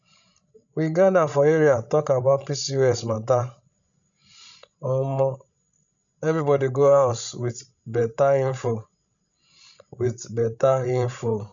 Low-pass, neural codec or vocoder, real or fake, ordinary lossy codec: 7.2 kHz; codec, 16 kHz, 16 kbps, FreqCodec, larger model; fake; none